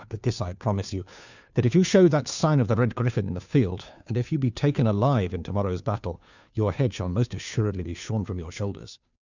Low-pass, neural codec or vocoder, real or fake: 7.2 kHz; codec, 16 kHz, 2 kbps, FunCodec, trained on Chinese and English, 25 frames a second; fake